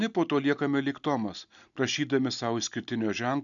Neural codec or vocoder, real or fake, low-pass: none; real; 7.2 kHz